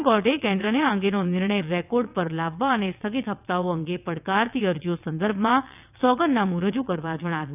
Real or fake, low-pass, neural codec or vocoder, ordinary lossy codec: fake; 3.6 kHz; vocoder, 22.05 kHz, 80 mel bands, WaveNeXt; none